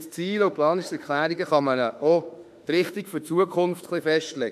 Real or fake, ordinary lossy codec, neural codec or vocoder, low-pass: fake; none; autoencoder, 48 kHz, 32 numbers a frame, DAC-VAE, trained on Japanese speech; 14.4 kHz